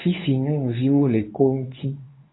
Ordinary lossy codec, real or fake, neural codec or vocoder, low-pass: AAC, 16 kbps; fake; codec, 24 kHz, 0.9 kbps, WavTokenizer, medium speech release version 2; 7.2 kHz